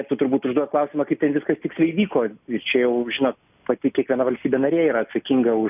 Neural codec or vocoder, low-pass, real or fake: none; 3.6 kHz; real